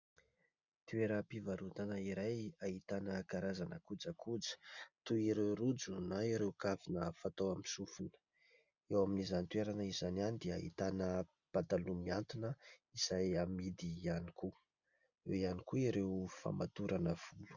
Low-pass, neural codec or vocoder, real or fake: 7.2 kHz; none; real